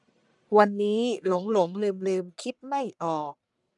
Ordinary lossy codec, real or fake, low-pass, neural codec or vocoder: none; fake; 10.8 kHz; codec, 44.1 kHz, 1.7 kbps, Pupu-Codec